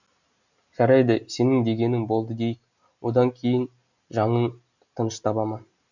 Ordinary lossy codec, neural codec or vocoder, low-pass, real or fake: none; none; 7.2 kHz; real